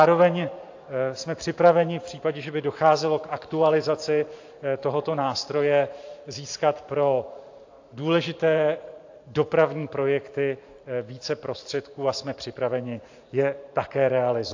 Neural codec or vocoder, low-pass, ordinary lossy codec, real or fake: vocoder, 44.1 kHz, 128 mel bands every 512 samples, BigVGAN v2; 7.2 kHz; AAC, 48 kbps; fake